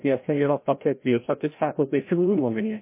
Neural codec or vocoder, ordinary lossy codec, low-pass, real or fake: codec, 16 kHz, 0.5 kbps, FreqCodec, larger model; MP3, 24 kbps; 3.6 kHz; fake